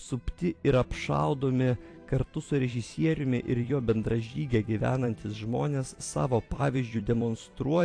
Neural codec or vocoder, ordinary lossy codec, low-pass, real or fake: vocoder, 44.1 kHz, 128 mel bands every 256 samples, BigVGAN v2; AAC, 48 kbps; 9.9 kHz; fake